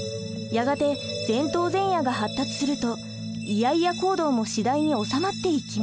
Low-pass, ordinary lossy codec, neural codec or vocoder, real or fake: none; none; none; real